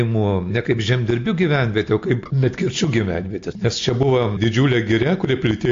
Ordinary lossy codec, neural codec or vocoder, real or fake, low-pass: AAC, 48 kbps; none; real; 7.2 kHz